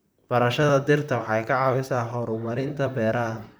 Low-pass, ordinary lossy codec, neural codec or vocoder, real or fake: none; none; vocoder, 44.1 kHz, 128 mel bands, Pupu-Vocoder; fake